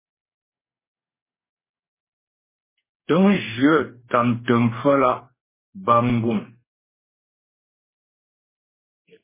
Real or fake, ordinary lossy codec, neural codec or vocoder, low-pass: fake; MP3, 16 kbps; codec, 44.1 kHz, 2.6 kbps, DAC; 3.6 kHz